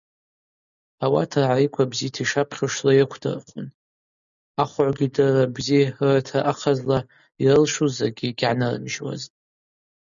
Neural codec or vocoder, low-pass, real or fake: none; 7.2 kHz; real